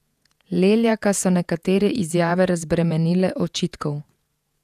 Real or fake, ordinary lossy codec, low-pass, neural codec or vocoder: fake; none; 14.4 kHz; vocoder, 48 kHz, 128 mel bands, Vocos